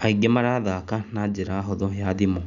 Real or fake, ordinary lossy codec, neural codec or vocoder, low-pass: real; none; none; 7.2 kHz